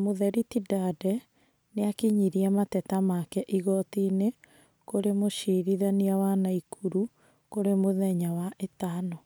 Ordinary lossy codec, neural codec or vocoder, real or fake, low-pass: none; none; real; none